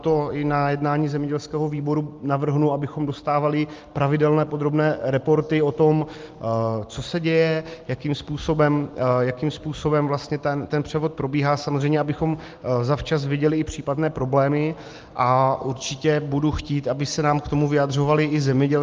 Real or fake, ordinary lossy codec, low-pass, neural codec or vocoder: real; Opus, 32 kbps; 7.2 kHz; none